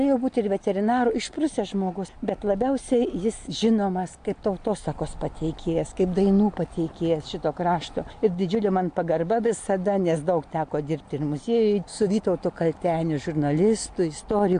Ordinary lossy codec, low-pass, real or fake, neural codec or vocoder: AAC, 64 kbps; 9.9 kHz; real; none